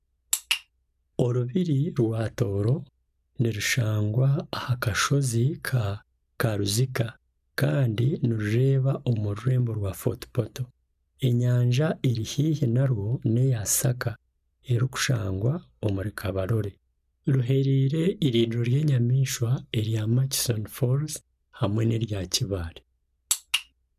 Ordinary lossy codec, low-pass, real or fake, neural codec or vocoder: none; 14.4 kHz; real; none